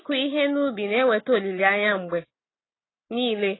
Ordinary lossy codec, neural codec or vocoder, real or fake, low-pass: AAC, 16 kbps; none; real; 7.2 kHz